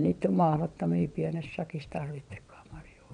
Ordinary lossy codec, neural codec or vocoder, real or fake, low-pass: none; vocoder, 22.05 kHz, 80 mel bands, WaveNeXt; fake; 9.9 kHz